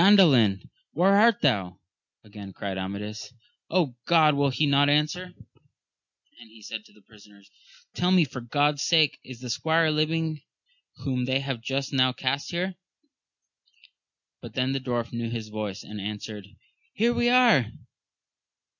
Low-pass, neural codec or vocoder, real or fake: 7.2 kHz; none; real